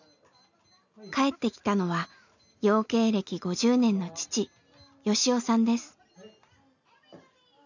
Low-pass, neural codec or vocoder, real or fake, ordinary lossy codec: 7.2 kHz; none; real; none